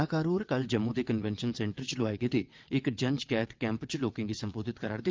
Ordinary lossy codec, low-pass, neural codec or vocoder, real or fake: Opus, 32 kbps; 7.2 kHz; vocoder, 22.05 kHz, 80 mel bands, WaveNeXt; fake